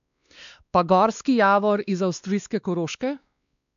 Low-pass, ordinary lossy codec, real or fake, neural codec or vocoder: 7.2 kHz; none; fake; codec, 16 kHz, 2 kbps, X-Codec, WavLM features, trained on Multilingual LibriSpeech